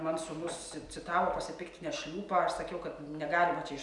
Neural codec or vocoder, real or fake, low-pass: none; real; 10.8 kHz